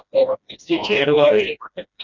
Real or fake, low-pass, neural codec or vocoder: fake; 7.2 kHz; codec, 16 kHz, 1 kbps, FreqCodec, smaller model